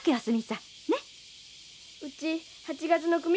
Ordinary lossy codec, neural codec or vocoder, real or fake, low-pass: none; none; real; none